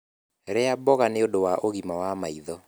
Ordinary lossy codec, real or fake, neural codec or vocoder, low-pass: none; real; none; none